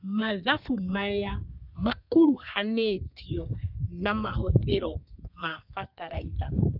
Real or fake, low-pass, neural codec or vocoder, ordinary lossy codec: fake; 5.4 kHz; codec, 32 kHz, 1.9 kbps, SNAC; none